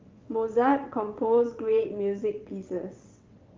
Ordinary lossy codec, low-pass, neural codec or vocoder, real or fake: Opus, 32 kbps; 7.2 kHz; vocoder, 22.05 kHz, 80 mel bands, WaveNeXt; fake